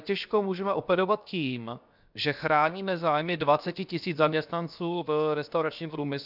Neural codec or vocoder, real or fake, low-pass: codec, 16 kHz, 0.7 kbps, FocalCodec; fake; 5.4 kHz